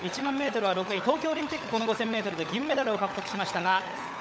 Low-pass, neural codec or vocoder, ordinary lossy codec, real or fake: none; codec, 16 kHz, 16 kbps, FunCodec, trained on LibriTTS, 50 frames a second; none; fake